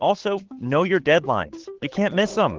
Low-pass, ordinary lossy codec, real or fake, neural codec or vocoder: 7.2 kHz; Opus, 16 kbps; fake; codec, 16 kHz, 8 kbps, FunCodec, trained on Chinese and English, 25 frames a second